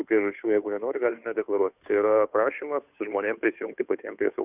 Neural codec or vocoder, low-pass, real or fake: codec, 16 kHz, 2 kbps, FunCodec, trained on Chinese and English, 25 frames a second; 3.6 kHz; fake